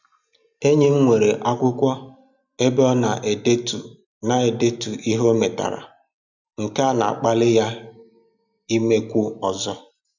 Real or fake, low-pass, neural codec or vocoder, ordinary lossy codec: real; 7.2 kHz; none; none